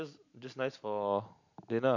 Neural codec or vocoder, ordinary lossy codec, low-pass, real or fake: none; none; 7.2 kHz; real